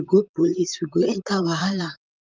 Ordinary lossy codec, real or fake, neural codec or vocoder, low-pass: Opus, 24 kbps; fake; vocoder, 44.1 kHz, 128 mel bands, Pupu-Vocoder; 7.2 kHz